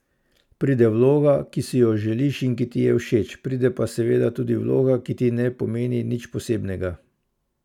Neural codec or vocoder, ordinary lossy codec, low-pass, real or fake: none; none; 19.8 kHz; real